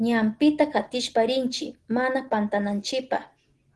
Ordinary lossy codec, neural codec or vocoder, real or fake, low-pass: Opus, 16 kbps; none; real; 10.8 kHz